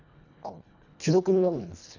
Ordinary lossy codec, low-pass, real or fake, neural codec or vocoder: none; 7.2 kHz; fake; codec, 24 kHz, 1.5 kbps, HILCodec